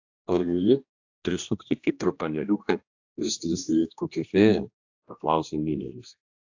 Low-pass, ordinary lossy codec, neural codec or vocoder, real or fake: 7.2 kHz; AAC, 48 kbps; codec, 16 kHz, 1 kbps, X-Codec, HuBERT features, trained on general audio; fake